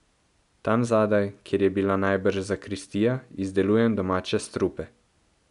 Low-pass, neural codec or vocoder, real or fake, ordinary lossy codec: 10.8 kHz; none; real; none